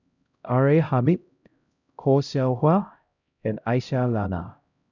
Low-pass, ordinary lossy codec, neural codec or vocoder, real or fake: 7.2 kHz; none; codec, 16 kHz, 0.5 kbps, X-Codec, HuBERT features, trained on LibriSpeech; fake